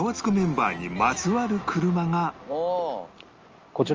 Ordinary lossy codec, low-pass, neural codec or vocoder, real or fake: Opus, 24 kbps; 7.2 kHz; none; real